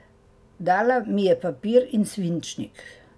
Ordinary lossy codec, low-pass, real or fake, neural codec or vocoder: none; none; real; none